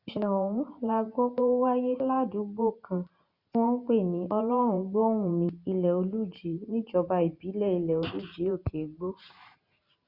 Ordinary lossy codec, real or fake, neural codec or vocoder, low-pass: Opus, 64 kbps; fake; vocoder, 44.1 kHz, 128 mel bands every 512 samples, BigVGAN v2; 5.4 kHz